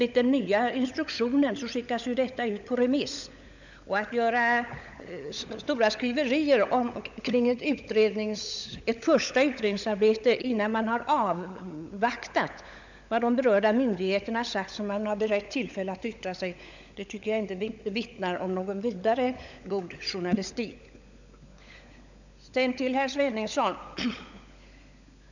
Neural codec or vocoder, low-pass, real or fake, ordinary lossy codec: codec, 16 kHz, 16 kbps, FunCodec, trained on LibriTTS, 50 frames a second; 7.2 kHz; fake; none